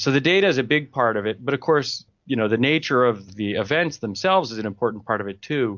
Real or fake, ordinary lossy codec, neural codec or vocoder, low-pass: real; MP3, 64 kbps; none; 7.2 kHz